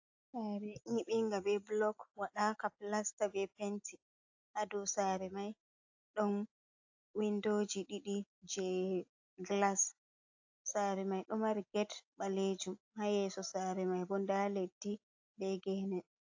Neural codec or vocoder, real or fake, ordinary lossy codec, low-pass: none; real; AAC, 48 kbps; 7.2 kHz